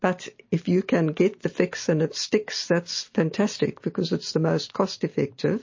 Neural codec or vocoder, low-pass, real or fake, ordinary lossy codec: none; 7.2 kHz; real; MP3, 32 kbps